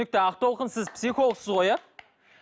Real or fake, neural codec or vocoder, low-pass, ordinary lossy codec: real; none; none; none